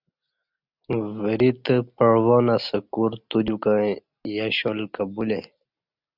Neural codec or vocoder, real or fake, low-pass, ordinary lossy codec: none; real; 5.4 kHz; Opus, 64 kbps